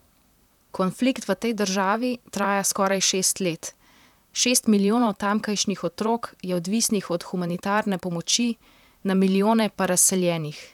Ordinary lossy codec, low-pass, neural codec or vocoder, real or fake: none; 19.8 kHz; vocoder, 44.1 kHz, 128 mel bands, Pupu-Vocoder; fake